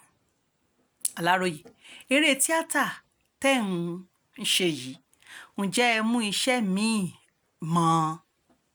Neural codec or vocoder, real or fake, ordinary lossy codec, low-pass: none; real; none; none